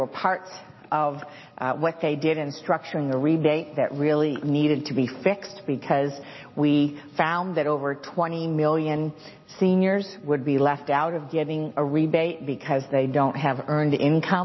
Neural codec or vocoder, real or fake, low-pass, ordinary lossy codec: none; real; 7.2 kHz; MP3, 24 kbps